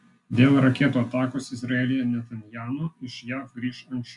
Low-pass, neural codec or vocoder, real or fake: 10.8 kHz; none; real